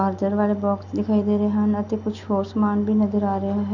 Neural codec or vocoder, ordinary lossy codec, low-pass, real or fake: none; none; 7.2 kHz; real